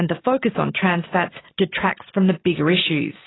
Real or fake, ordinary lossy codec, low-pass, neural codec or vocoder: real; AAC, 16 kbps; 7.2 kHz; none